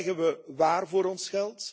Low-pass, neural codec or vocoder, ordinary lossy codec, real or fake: none; none; none; real